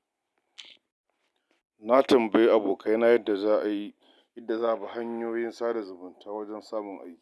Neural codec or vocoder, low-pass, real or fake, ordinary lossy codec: none; none; real; none